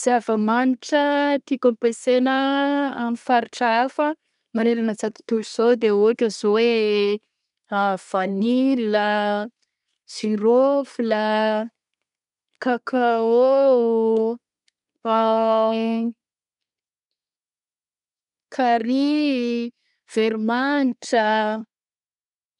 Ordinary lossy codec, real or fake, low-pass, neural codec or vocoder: none; fake; 10.8 kHz; codec, 24 kHz, 1 kbps, SNAC